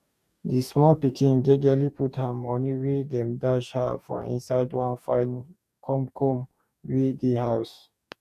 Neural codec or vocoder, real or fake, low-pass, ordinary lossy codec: codec, 44.1 kHz, 2.6 kbps, DAC; fake; 14.4 kHz; none